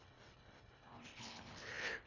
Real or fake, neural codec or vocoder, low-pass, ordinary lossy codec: fake; codec, 24 kHz, 1.5 kbps, HILCodec; 7.2 kHz; none